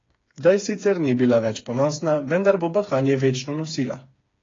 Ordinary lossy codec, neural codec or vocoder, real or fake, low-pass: AAC, 32 kbps; codec, 16 kHz, 4 kbps, FreqCodec, smaller model; fake; 7.2 kHz